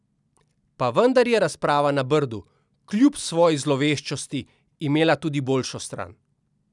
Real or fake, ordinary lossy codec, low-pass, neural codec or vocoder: real; none; 10.8 kHz; none